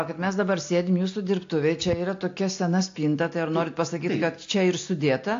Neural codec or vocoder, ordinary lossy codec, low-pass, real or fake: none; AAC, 48 kbps; 7.2 kHz; real